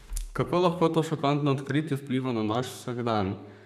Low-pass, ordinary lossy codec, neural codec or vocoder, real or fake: 14.4 kHz; none; codec, 32 kHz, 1.9 kbps, SNAC; fake